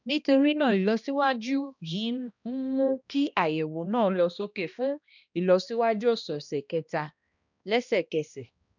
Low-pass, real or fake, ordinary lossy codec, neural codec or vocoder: 7.2 kHz; fake; none; codec, 16 kHz, 1 kbps, X-Codec, HuBERT features, trained on balanced general audio